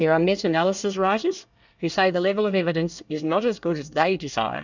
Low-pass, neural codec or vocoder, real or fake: 7.2 kHz; codec, 24 kHz, 1 kbps, SNAC; fake